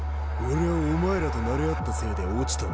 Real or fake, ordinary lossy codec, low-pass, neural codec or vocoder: real; none; none; none